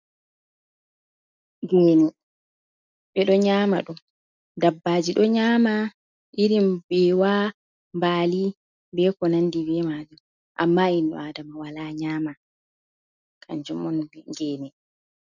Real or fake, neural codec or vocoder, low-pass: real; none; 7.2 kHz